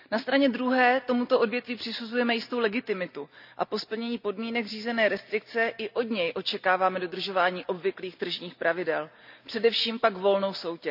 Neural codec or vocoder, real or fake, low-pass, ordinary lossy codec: none; real; 5.4 kHz; none